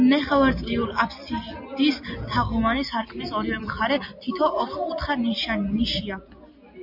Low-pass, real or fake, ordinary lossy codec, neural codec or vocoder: 5.4 kHz; real; MP3, 48 kbps; none